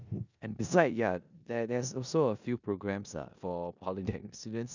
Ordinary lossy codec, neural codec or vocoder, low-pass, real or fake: none; codec, 16 kHz in and 24 kHz out, 0.9 kbps, LongCat-Audio-Codec, four codebook decoder; 7.2 kHz; fake